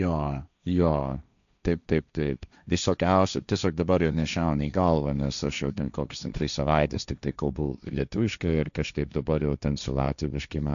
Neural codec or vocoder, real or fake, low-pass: codec, 16 kHz, 1.1 kbps, Voila-Tokenizer; fake; 7.2 kHz